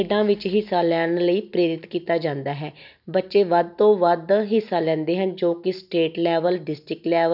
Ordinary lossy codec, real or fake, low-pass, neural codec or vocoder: none; real; 5.4 kHz; none